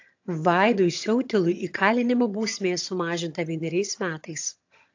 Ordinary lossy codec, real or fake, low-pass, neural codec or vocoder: AAC, 48 kbps; fake; 7.2 kHz; vocoder, 22.05 kHz, 80 mel bands, HiFi-GAN